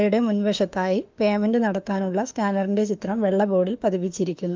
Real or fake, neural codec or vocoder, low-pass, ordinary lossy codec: fake; autoencoder, 48 kHz, 32 numbers a frame, DAC-VAE, trained on Japanese speech; 7.2 kHz; Opus, 24 kbps